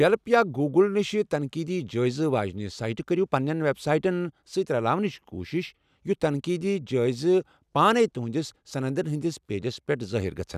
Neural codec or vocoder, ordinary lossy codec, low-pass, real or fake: none; none; 14.4 kHz; real